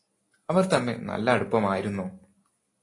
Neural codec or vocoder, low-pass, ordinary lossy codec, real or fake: none; 10.8 kHz; AAC, 32 kbps; real